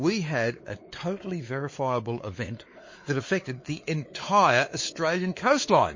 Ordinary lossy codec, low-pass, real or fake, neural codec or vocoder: MP3, 32 kbps; 7.2 kHz; fake; codec, 16 kHz, 4 kbps, X-Codec, WavLM features, trained on Multilingual LibriSpeech